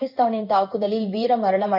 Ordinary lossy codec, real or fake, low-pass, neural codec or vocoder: none; fake; 5.4 kHz; codec, 16 kHz in and 24 kHz out, 1 kbps, XY-Tokenizer